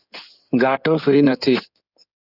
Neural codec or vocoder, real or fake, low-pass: codec, 16 kHz in and 24 kHz out, 2.2 kbps, FireRedTTS-2 codec; fake; 5.4 kHz